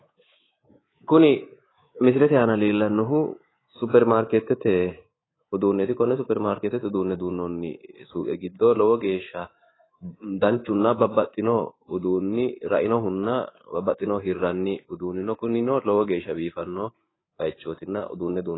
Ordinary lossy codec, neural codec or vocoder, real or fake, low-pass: AAC, 16 kbps; codec, 24 kHz, 3.1 kbps, DualCodec; fake; 7.2 kHz